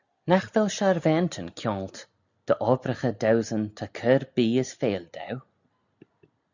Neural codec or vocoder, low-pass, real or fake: none; 7.2 kHz; real